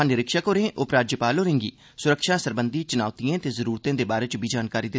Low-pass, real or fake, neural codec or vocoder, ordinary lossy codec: none; real; none; none